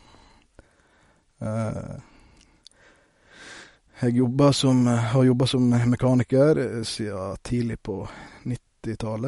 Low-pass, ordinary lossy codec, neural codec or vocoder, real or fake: 19.8 kHz; MP3, 48 kbps; none; real